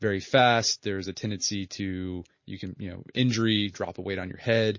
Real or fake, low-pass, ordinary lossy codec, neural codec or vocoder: real; 7.2 kHz; MP3, 32 kbps; none